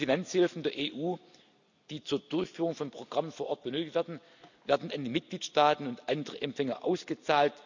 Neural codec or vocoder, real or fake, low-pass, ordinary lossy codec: none; real; 7.2 kHz; none